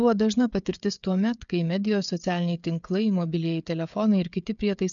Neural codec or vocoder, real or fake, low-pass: codec, 16 kHz, 16 kbps, FreqCodec, smaller model; fake; 7.2 kHz